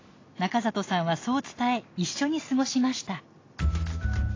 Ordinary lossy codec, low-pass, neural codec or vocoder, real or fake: AAC, 32 kbps; 7.2 kHz; none; real